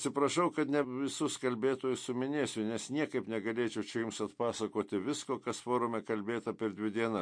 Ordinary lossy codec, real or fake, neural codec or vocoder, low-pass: MP3, 48 kbps; real; none; 9.9 kHz